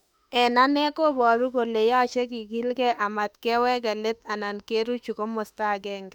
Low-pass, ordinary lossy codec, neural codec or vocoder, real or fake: 19.8 kHz; none; autoencoder, 48 kHz, 32 numbers a frame, DAC-VAE, trained on Japanese speech; fake